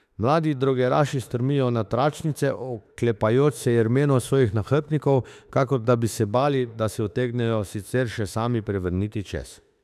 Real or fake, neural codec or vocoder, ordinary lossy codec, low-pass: fake; autoencoder, 48 kHz, 32 numbers a frame, DAC-VAE, trained on Japanese speech; none; 14.4 kHz